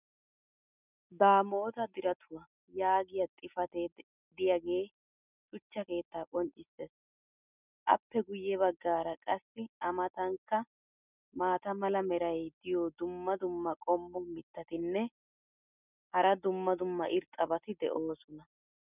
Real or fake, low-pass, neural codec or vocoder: real; 3.6 kHz; none